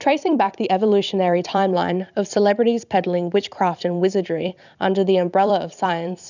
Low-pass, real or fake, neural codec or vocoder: 7.2 kHz; fake; vocoder, 44.1 kHz, 128 mel bands every 256 samples, BigVGAN v2